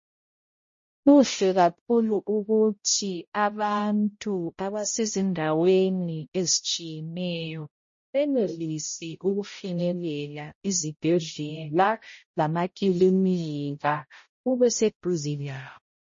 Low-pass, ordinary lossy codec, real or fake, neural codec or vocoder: 7.2 kHz; MP3, 32 kbps; fake; codec, 16 kHz, 0.5 kbps, X-Codec, HuBERT features, trained on balanced general audio